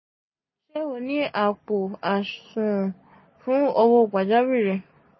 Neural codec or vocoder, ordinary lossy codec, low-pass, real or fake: none; MP3, 24 kbps; 7.2 kHz; real